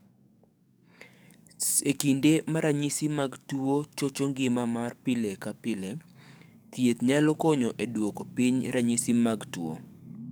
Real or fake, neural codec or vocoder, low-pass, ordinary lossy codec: fake; codec, 44.1 kHz, 7.8 kbps, DAC; none; none